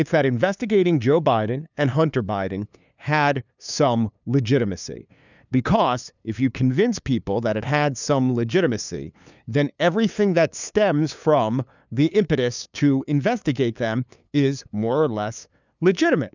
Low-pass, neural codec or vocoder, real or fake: 7.2 kHz; codec, 16 kHz, 2 kbps, FunCodec, trained on LibriTTS, 25 frames a second; fake